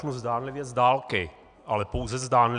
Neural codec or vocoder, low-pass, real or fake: none; 9.9 kHz; real